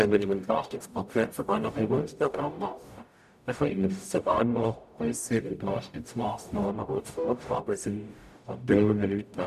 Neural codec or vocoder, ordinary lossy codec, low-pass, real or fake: codec, 44.1 kHz, 0.9 kbps, DAC; none; 14.4 kHz; fake